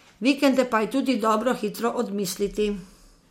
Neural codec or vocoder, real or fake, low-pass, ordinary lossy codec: none; real; 19.8 kHz; MP3, 64 kbps